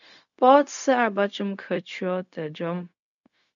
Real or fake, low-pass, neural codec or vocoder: fake; 7.2 kHz; codec, 16 kHz, 0.4 kbps, LongCat-Audio-Codec